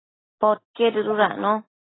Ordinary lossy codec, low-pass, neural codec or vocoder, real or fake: AAC, 16 kbps; 7.2 kHz; none; real